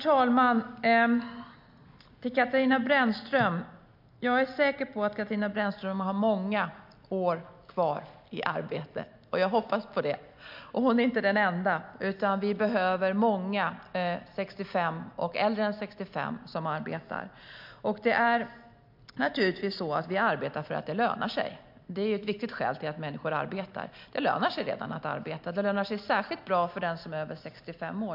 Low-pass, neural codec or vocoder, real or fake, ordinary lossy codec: 5.4 kHz; none; real; MP3, 48 kbps